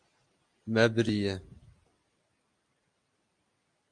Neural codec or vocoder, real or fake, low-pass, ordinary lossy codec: none; real; 9.9 kHz; MP3, 64 kbps